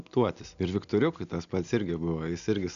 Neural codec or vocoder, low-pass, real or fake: none; 7.2 kHz; real